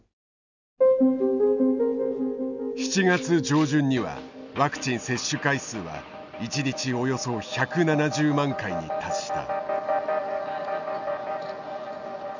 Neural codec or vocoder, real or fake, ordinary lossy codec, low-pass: none; real; none; 7.2 kHz